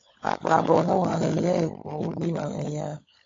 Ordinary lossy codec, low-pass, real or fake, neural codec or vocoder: AAC, 32 kbps; 7.2 kHz; fake; codec, 16 kHz, 16 kbps, FunCodec, trained on LibriTTS, 50 frames a second